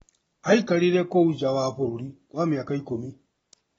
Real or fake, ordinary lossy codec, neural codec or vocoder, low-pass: real; AAC, 24 kbps; none; 19.8 kHz